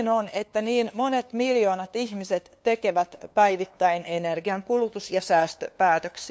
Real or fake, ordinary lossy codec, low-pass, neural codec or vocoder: fake; none; none; codec, 16 kHz, 2 kbps, FunCodec, trained on LibriTTS, 25 frames a second